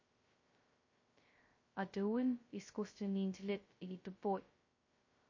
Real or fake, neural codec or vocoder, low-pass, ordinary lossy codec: fake; codec, 16 kHz, 0.2 kbps, FocalCodec; 7.2 kHz; MP3, 32 kbps